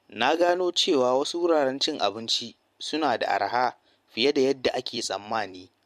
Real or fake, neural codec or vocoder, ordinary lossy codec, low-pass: fake; vocoder, 44.1 kHz, 128 mel bands every 256 samples, BigVGAN v2; MP3, 64 kbps; 14.4 kHz